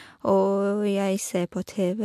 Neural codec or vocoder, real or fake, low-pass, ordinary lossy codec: none; real; 14.4 kHz; MP3, 64 kbps